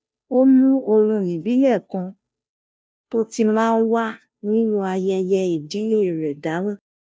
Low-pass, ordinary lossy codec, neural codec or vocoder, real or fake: none; none; codec, 16 kHz, 0.5 kbps, FunCodec, trained on Chinese and English, 25 frames a second; fake